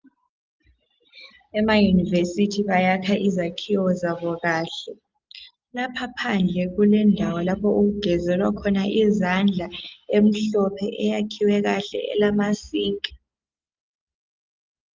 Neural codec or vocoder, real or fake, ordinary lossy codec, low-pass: none; real; Opus, 24 kbps; 7.2 kHz